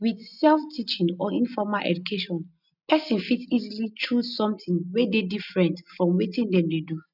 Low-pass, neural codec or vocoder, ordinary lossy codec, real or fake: 5.4 kHz; none; none; real